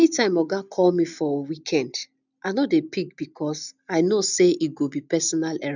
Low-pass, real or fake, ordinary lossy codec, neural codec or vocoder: 7.2 kHz; real; none; none